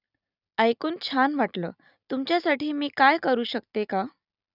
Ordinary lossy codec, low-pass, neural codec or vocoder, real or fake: none; 5.4 kHz; none; real